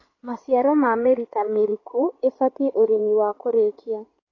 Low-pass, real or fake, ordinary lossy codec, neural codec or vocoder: 7.2 kHz; fake; AAC, 32 kbps; codec, 16 kHz in and 24 kHz out, 2.2 kbps, FireRedTTS-2 codec